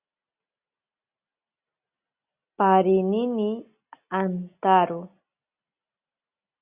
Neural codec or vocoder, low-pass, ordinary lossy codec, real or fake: none; 3.6 kHz; Opus, 64 kbps; real